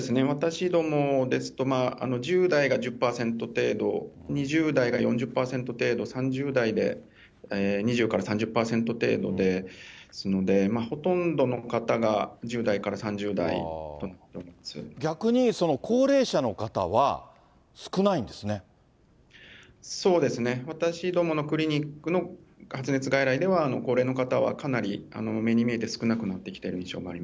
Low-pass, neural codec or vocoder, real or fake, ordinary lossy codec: none; none; real; none